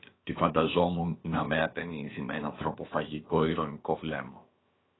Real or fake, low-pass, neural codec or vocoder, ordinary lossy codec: fake; 7.2 kHz; codec, 16 kHz, about 1 kbps, DyCAST, with the encoder's durations; AAC, 16 kbps